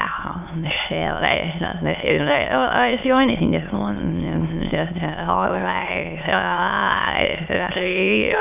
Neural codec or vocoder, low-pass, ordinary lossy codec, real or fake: autoencoder, 22.05 kHz, a latent of 192 numbers a frame, VITS, trained on many speakers; 3.6 kHz; none; fake